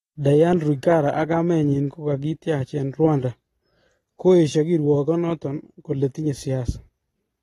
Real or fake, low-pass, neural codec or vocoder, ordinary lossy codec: real; 14.4 kHz; none; AAC, 32 kbps